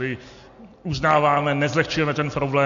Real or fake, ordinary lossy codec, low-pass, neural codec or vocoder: real; AAC, 48 kbps; 7.2 kHz; none